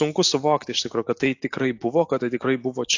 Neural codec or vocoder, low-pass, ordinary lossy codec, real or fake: none; 7.2 kHz; AAC, 48 kbps; real